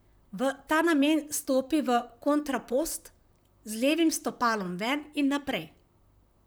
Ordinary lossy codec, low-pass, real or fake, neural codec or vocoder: none; none; fake; vocoder, 44.1 kHz, 128 mel bands, Pupu-Vocoder